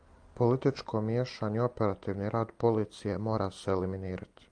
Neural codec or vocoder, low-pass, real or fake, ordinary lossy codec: none; 9.9 kHz; real; Opus, 32 kbps